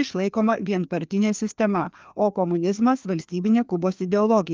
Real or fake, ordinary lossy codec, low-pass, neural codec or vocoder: fake; Opus, 24 kbps; 7.2 kHz; codec, 16 kHz, 2 kbps, FreqCodec, larger model